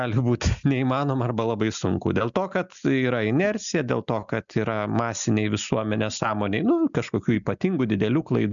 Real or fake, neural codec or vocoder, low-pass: real; none; 7.2 kHz